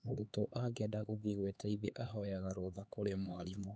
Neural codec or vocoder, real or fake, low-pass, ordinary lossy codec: codec, 16 kHz, 4 kbps, X-Codec, HuBERT features, trained on LibriSpeech; fake; none; none